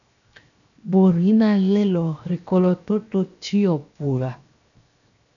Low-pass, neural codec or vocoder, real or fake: 7.2 kHz; codec, 16 kHz, 0.7 kbps, FocalCodec; fake